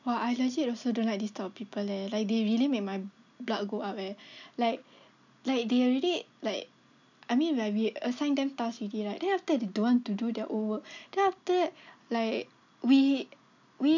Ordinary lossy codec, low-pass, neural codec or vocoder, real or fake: none; 7.2 kHz; none; real